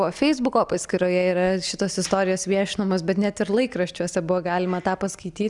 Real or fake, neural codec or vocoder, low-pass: real; none; 10.8 kHz